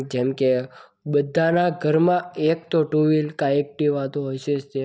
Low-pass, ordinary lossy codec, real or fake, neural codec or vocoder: none; none; real; none